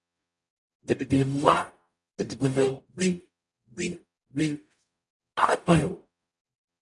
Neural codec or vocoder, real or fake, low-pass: codec, 44.1 kHz, 0.9 kbps, DAC; fake; 10.8 kHz